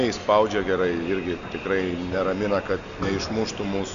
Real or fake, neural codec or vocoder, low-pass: real; none; 7.2 kHz